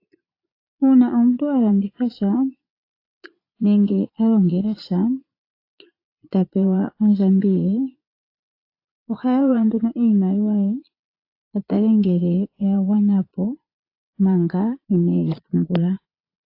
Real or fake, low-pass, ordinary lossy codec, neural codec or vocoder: fake; 5.4 kHz; AAC, 32 kbps; vocoder, 22.05 kHz, 80 mel bands, Vocos